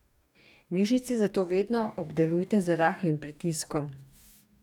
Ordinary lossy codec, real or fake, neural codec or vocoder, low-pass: none; fake; codec, 44.1 kHz, 2.6 kbps, DAC; 19.8 kHz